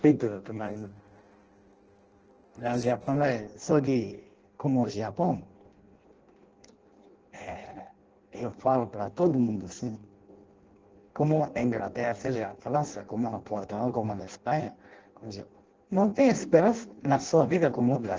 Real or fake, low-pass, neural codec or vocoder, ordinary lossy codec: fake; 7.2 kHz; codec, 16 kHz in and 24 kHz out, 0.6 kbps, FireRedTTS-2 codec; Opus, 16 kbps